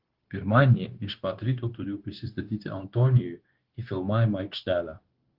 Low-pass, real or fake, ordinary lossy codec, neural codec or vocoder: 5.4 kHz; fake; Opus, 16 kbps; codec, 16 kHz, 0.9 kbps, LongCat-Audio-Codec